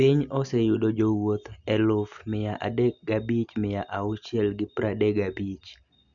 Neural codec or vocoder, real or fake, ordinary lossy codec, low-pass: none; real; none; 7.2 kHz